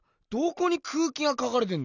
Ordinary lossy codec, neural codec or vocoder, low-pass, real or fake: none; none; 7.2 kHz; real